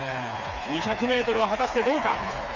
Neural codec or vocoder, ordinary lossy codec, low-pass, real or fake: codec, 16 kHz, 4 kbps, FreqCodec, smaller model; none; 7.2 kHz; fake